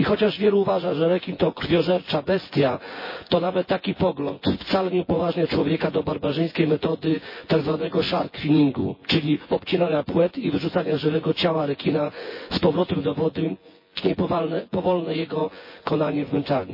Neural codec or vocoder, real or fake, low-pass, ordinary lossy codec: vocoder, 24 kHz, 100 mel bands, Vocos; fake; 5.4 kHz; MP3, 24 kbps